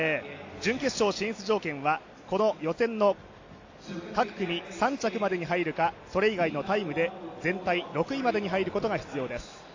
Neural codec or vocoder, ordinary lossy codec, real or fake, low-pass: none; AAC, 48 kbps; real; 7.2 kHz